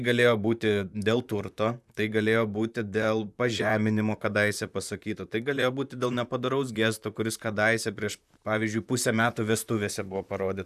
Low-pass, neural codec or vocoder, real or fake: 14.4 kHz; vocoder, 44.1 kHz, 128 mel bands, Pupu-Vocoder; fake